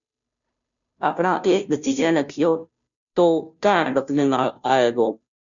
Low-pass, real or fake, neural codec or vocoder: 7.2 kHz; fake; codec, 16 kHz, 0.5 kbps, FunCodec, trained on Chinese and English, 25 frames a second